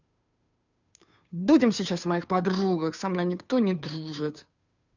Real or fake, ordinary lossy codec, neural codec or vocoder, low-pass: fake; none; codec, 16 kHz, 2 kbps, FunCodec, trained on Chinese and English, 25 frames a second; 7.2 kHz